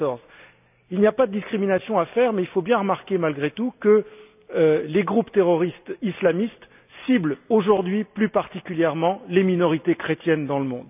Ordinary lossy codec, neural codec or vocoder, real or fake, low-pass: none; none; real; 3.6 kHz